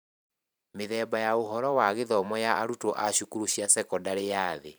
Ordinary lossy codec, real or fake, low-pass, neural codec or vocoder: none; fake; none; vocoder, 44.1 kHz, 128 mel bands every 512 samples, BigVGAN v2